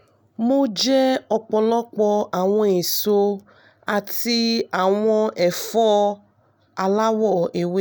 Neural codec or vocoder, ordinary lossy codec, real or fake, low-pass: none; none; real; none